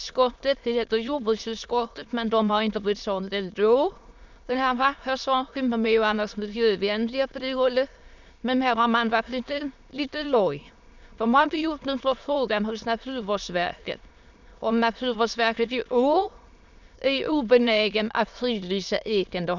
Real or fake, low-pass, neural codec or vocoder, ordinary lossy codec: fake; 7.2 kHz; autoencoder, 22.05 kHz, a latent of 192 numbers a frame, VITS, trained on many speakers; none